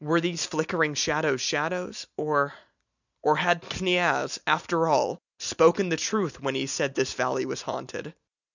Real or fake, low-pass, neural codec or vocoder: real; 7.2 kHz; none